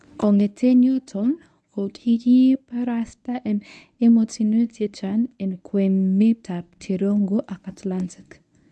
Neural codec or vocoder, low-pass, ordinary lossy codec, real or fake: codec, 24 kHz, 0.9 kbps, WavTokenizer, medium speech release version 1; none; none; fake